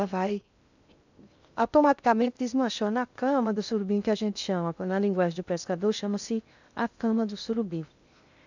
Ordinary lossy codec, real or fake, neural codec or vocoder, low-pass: none; fake; codec, 16 kHz in and 24 kHz out, 0.6 kbps, FocalCodec, streaming, 2048 codes; 7.2 kHz